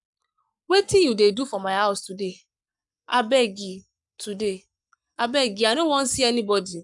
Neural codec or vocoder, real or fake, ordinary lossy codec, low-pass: codec, 44.1 kHz, 7.8 kbps, Pupu-Codec; fake; none; 10.8 kHz